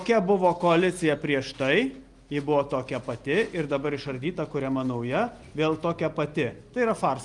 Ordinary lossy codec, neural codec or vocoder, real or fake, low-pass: Opus, 32 kbps; none; real; 10.8 kHz